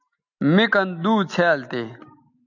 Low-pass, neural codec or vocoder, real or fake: 7.2 kHz; none; real